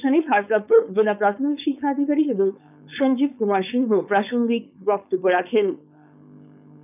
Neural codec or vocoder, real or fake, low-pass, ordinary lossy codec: codec, 16 kHz, 4.8 kbps, FACodec; fake; 3.6 kHz; none